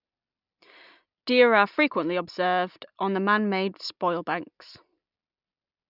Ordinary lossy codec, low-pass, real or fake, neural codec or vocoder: none; 5.4 kHz; real; none